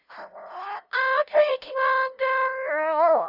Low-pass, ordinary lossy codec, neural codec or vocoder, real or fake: 5.4 kHz; none; codec, 16 kHz, 0.5 kbps, FunCodec, trained on LibriTTS, 25 frames a second; fake